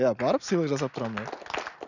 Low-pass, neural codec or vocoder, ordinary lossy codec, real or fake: 7.2 kHz; none; none; real